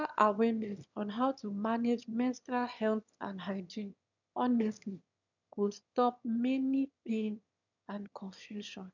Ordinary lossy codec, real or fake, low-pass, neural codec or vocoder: none; fake; 7.2 kHz; autoencoder, 22.05 kHz, a latent of 192 numbers a frame, VITS, trained on one speaker